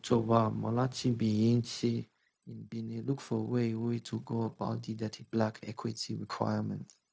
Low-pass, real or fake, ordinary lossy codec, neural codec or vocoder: none; fake; none; codec, 16 kHz, 0.4 kbps, LongCat-Audio-Codec